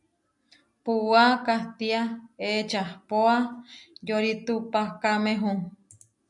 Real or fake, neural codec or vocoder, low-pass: real; none; 10.8 kHz